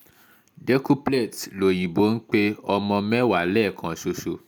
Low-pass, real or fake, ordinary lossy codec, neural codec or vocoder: none; real; none; none